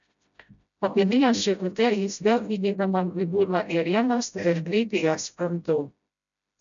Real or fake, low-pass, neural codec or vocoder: fake; 7.2 kHz; codec, 16 kHz, 0.5 kbps, FreqCodec, smaller model